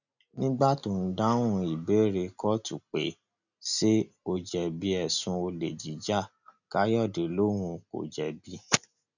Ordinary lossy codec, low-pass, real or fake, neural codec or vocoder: none; 7.2 kHz; real; none